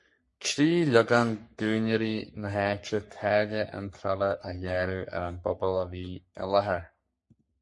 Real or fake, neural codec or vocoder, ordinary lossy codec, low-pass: fake; codec, 44.1 kHz, 3.4 kbps, Pupu-Codec; MP3, 48 kbps; 10.8 kHz